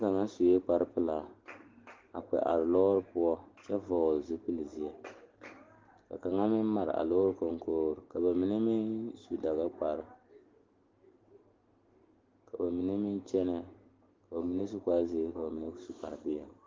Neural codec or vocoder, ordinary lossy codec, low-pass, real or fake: none; Opus, 16 kbps; 7.2 kHz; real